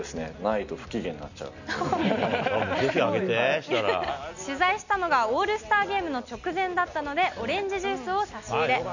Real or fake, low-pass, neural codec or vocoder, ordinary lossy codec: real; 7.2 kHz; none; none